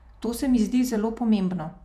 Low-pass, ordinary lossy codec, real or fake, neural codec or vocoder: 14.4 kHz; none; fake; vocoder, 48 kHz, 128 mel bands, Vocos